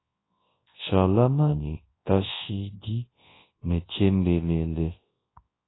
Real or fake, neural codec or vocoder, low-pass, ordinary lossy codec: fake; codec, 24 kHz, 0.9 kbps, WavTokenizer, large speech release; 7.2 kHz; AAC, 16 kbps